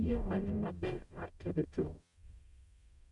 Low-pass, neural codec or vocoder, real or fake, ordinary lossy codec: 10.8 kHz; codec, 44.1 kHz, 0.9 kbps, DAC; fake; none